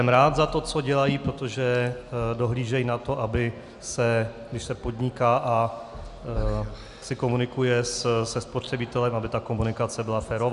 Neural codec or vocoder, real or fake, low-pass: none; real; 10.8 kHz